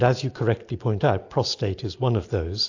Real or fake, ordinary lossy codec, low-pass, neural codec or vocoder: real; AAC, 48 kbps; 7.2 kHz; none